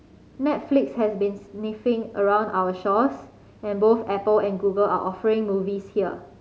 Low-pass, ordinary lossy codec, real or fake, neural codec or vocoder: none; none; real; none